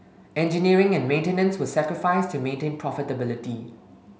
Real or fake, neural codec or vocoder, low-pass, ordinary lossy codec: real; none; none; none